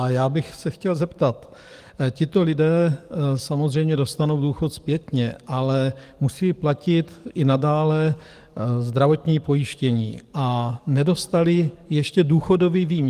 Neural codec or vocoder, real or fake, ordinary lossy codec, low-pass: codec, 44.1 kHz, 7.8 kbps, DAC; fake; Opus, 24 kbps; 14.4 kHz